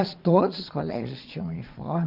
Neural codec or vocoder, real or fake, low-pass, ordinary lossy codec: vocoder, 22.05 kHz, 80 mel bands, Vocos; fake; 5.4 kHz; none